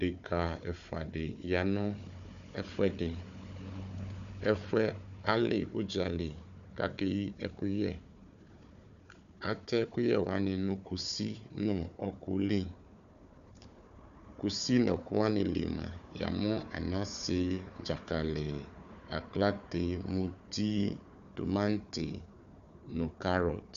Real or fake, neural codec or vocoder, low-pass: fake; codec, 16 kHz, 4 kbps, FunCodec, trained on Chinese and English, 50 frames a second; 7.2 kHz